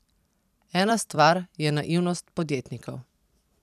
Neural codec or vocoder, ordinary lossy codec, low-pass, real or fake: vocoder, 44.1 kHz, 128 mel bands every 256 samples, BigVGAN v2; none; 14.4 kHz; fake